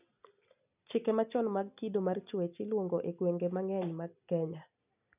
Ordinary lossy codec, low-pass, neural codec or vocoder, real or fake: none; 3.6 kHz; none; real